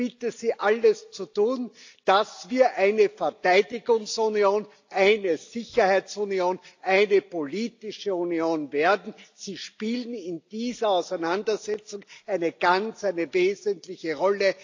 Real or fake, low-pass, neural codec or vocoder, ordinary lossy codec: real; 7.2 kHz; none; AAC, 48 kbps